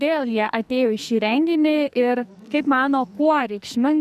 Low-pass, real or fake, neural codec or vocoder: 14.4 kHz; fake; codec, 44.1 kHz, 2.6 kbps, SNAC